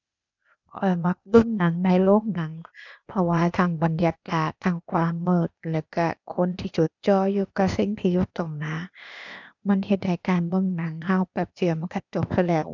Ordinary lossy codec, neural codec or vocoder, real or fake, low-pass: none; codec, 16 kHz, 0.8 kbps, ZipCodec; fake; 7.2 kHz